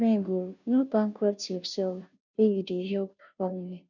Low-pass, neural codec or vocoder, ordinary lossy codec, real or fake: 7.2 kHz; codec, 16 kHz, 0.5 kbps, FunCodec, trained on Chinese and English, 25 frames a second; none; fake